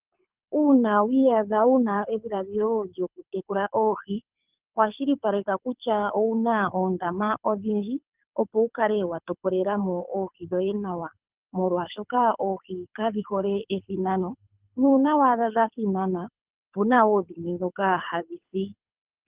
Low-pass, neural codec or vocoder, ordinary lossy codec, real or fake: 3.6 kHz; codec, 24 kHz, 6 kbps, HILCodec; Opus, 32 kbps; fake